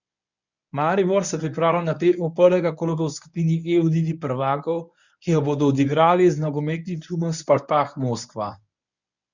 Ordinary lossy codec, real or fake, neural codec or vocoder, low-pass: none; fake; codec, 24 kHz, 0.9 kbps, WavTokenizer, medium speech release version 1; 7.2 kHz